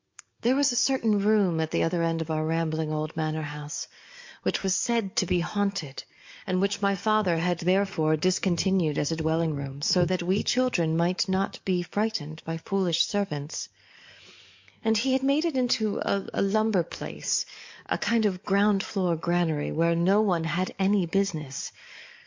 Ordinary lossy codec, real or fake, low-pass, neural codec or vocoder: MP3, 48 kbps; fake; 7.2 kHz; codec, 44.1 kHz, 7.8 kbps, DAC